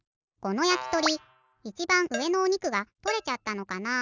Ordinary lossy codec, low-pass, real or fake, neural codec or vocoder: none; 7.2 kHz; real; none